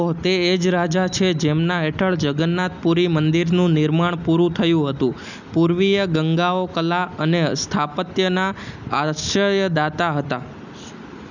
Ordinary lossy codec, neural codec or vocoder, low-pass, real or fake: none; none; 7.2 kHz; real